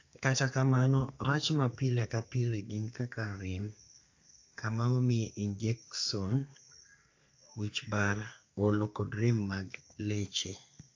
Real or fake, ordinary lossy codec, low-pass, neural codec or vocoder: fake; AAC, 48 kbps; 7.2 kHz; codec, 32 kHz, 1.9 kbps, SNAC